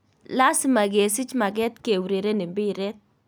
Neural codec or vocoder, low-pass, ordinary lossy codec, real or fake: none; none; none; real